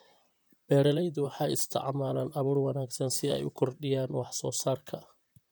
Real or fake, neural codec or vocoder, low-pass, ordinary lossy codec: fake; vocoder, 44.1 kHz, 128 mel bands, Pupu-Vocoder; none; none